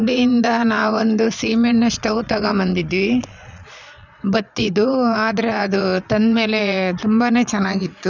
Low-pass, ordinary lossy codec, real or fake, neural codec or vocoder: 7.2 kHz; none; fake; vocoder, 22.05 kHz, 80 mel bands, Vocos